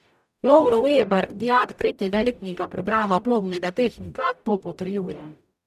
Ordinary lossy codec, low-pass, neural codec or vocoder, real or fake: none; 14.4 kHz; codec, 44.1 kHz, 0.9 kbps, DAC; fake